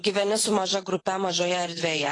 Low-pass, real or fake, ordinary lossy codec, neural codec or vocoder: 10.8 kHz; fake; AAC, 32 kbps; vocoder, 24 kHz, 100 mel bands, Vocos